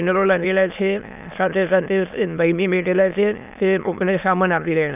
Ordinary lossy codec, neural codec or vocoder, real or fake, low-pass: none; autoencoder, 22.05 kHz, a latent of 192 numbers a frame, VITS, trained on many speakers; fake; 3.6 kHz